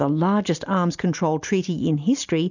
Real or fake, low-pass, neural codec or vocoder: real; 7.2 kHz; none